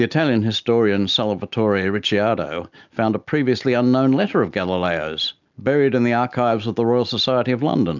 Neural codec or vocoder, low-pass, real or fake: none; 7.2 kHz; real